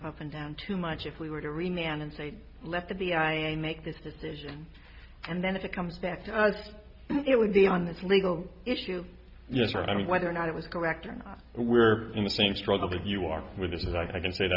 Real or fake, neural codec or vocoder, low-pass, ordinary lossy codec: real; none; 5.4 kHz; Opus, 64 kbps